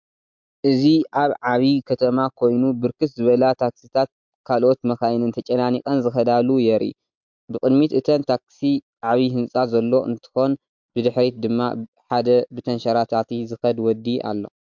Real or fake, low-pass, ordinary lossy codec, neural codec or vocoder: real; 7.2 kHz; MP3, 64 kbps; none